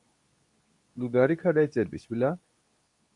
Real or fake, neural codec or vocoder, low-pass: fake; codec, 24 kHz, 0.9 kbps, WavTokenizer, medium speech release version 2; 10.8 kHz